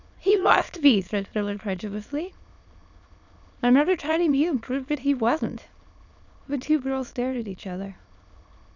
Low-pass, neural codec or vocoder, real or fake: 7.2 kHz; autoencoder, 22.05 kHz, a latent of 192 numbers a frame, VITS, trained on many speakers; fake